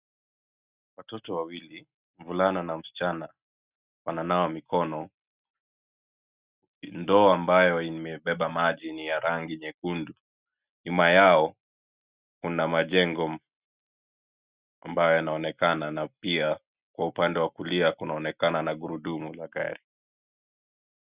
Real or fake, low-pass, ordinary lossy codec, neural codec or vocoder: real; 3.6 kHz; Opus, 64 kbps; none